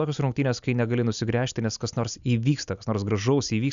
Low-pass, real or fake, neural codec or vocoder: 7.2 kHz; real; none